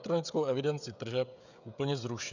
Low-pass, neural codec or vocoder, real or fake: 7.2 kHz; codec, 16 kHz, 16 kbps, FreqCodec, smaller model; fake